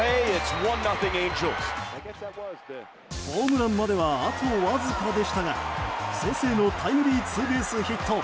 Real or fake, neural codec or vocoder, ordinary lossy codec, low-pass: real; none; none; none